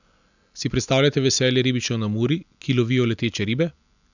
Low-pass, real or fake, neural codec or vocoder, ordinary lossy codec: 7.2 kHz; real; none; none